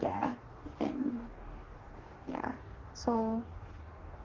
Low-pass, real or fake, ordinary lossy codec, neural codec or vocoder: 7.2 kHz; fake; Opus, 16 kbps; codec, 44.1 kHz, 2.6 kbps, DAC